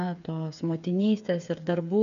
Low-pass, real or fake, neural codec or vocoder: 7.2 kHz; fake; codec, 16 kHz, 8 kbps, FreqCodec, smaller model